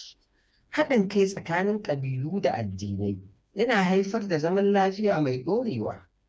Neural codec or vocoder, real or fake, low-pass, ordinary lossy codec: codec, 16 kHz, 2 kbps, FreqCodec, smaller model; fake; none; none